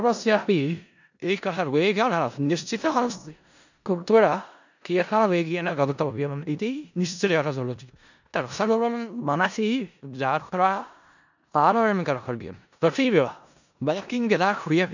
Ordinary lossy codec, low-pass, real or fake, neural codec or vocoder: none; 7.2 kHz; fake; codec, 16 kHz in and 24 kHz out, 0.4 kbps, LongCat-Audio-Codec, four codebook decoder